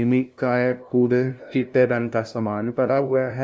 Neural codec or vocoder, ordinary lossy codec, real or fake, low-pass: codec, 16 kHz, 0.5 kbps, FunCodec, trained on LibriTTS, 25 frames a second; none; fake; none